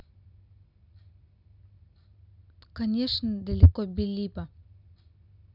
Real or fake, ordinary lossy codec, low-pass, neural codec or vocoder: real; none; 5.4 kHz; none